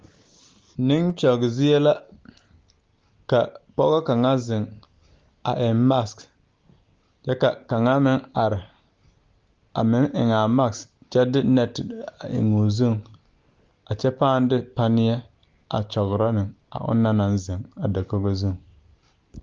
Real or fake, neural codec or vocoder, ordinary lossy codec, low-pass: real; none; Opus, 32 kbps; 7.2 kHz